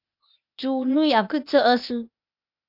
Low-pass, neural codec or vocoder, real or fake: 5.4 kHz; codec, 16 kHz, 0.8 kbps, ZipCodec; fake